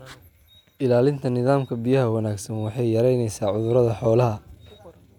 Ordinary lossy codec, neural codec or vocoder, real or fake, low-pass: none; none; real; 19.8 kHz